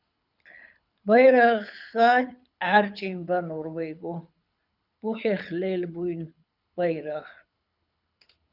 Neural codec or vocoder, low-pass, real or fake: codec, 24 kHz, 6 kbps, HILCodec; 5.4 kHz; fake